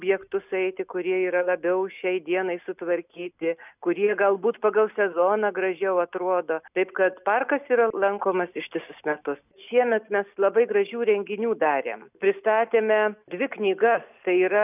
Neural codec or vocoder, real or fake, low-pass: none; real; 3.6 kHz